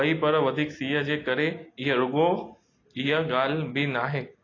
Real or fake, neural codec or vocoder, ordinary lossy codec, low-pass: real; none; AAC, 32 kbps; 7.2 kHz